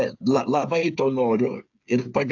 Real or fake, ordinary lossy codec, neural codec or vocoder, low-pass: fake; AAC, 48 kbps; codec, 16 kHz, 8 kbps, FreqCodec, smaller model; 7.2 kHz